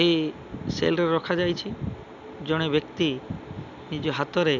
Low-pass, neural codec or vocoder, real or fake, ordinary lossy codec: 7.2 kHz; none; real; none